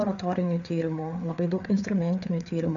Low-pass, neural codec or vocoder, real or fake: 7.2 kHz; codec, 16 kHz, 4 kbps, FreqCodec, larger model; fake